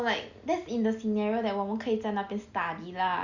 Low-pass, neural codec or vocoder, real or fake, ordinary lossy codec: 7.2 kHz; none; real; Opus, 64 kbps